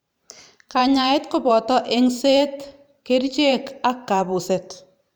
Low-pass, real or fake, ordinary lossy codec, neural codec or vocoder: none; fake; none; vocoder, 44.1 kHz, 128 mel bands every 512 samples, BigVGAN v2